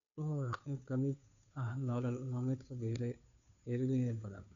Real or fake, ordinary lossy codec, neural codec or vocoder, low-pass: fake; MP3, 64 kbps; codec, 16 kHz, 2 kbps, FunCodec, trained on Chinese and English, 25 frames a second; 7.2 kHz